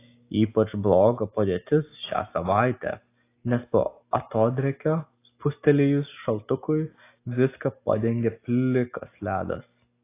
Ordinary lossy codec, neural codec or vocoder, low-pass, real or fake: AAC, 24 kbps; none; 3.6 kHz; real